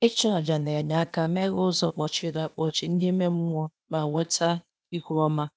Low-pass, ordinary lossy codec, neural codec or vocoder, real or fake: none; none; codec, 16 kHz, 0.8 kbps, ZipCodec; fake